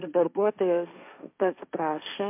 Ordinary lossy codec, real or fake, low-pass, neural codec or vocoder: MP3, 32 kbps; fake; 3.6 kHz; codec, 16 kHz, 1.1 kbps, Voila-Tokenizer